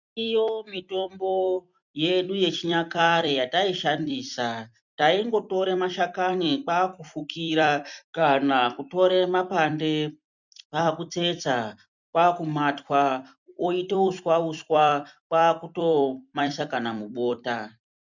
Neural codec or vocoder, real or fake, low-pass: vocoder, 44.1 kHz, 128 mel bands every 256 samples, BigVGAN v2; fake; 7.2 kHz